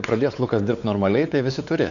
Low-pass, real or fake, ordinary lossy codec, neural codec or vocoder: 7.2 kHz; fake; Opus, 64 kbps; codec, 16 kHz, 4 kbps, X-Codec, WavLM features, trained on Multilingual LibriSpeech